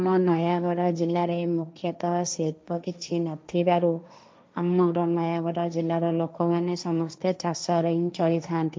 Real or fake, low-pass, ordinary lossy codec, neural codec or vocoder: fake; none; none; codec, 16 kHz, 1.1 kbps, Voila-Tokenizer